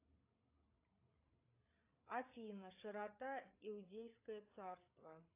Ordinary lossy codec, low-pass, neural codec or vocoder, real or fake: AAC, 24 kbps; 3.6 kHz; codec, 16 kHz, 8 kbps, FreqCodec, larger model; fake